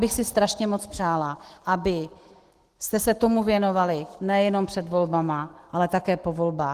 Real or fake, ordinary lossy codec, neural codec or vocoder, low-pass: fake; Opus, 16 kbps; autoencoder, 48 kHz, 128 numbers a frame, DAC-VAE, trained on Japanese speech; 14.4 kHz